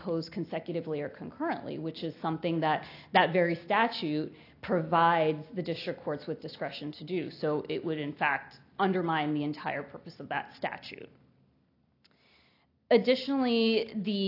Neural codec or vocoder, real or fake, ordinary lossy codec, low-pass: none; real; AAC, 32 kbps; 5.4 kHz